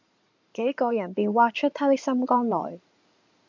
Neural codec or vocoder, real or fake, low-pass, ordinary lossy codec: codec, 16 kHz in and 24 kHz out, 2.2 kbps, FireRedTTS-2 codec; fake; 7.2 kHz; MP3, 64 kbps